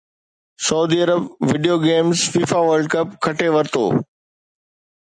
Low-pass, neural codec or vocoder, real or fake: 9.9 kHz; none; real